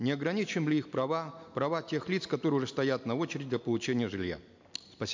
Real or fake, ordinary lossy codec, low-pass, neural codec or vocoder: real; MP3, 64 kbps; 7.2 kHz; none